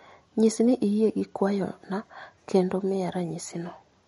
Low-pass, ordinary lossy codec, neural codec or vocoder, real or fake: 19.8 kHz; MP3, 48 kbps; none; real